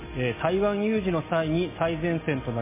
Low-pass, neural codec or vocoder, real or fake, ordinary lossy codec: 3.6 kHz; none; real; MP3, 16 kbps